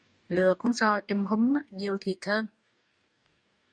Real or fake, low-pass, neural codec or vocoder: fake; 9.9 kHz; codec, 44.1 kHz, 2.6 kbps, DAC